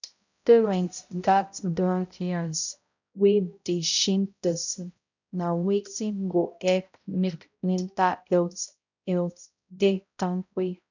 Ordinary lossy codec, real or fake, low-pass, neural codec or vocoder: none; fake; 7.2 kHz; codec, 16 kHz, 0.5 kbps, X-Codec, HuBERT features, trained on balanced general audio